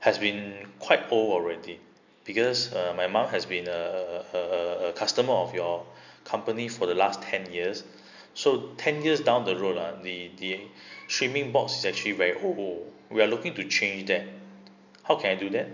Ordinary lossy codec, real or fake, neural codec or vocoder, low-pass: none; real; none; 7.2 kHz